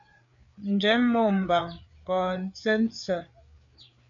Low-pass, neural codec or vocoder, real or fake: 7.2 kHz; codec, 16 kHz, 4 kbps, FreqCodec, larger model; fake